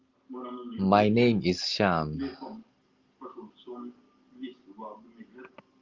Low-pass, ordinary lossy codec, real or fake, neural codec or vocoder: 7.2 kHz; Opus, 32 kbps; real; none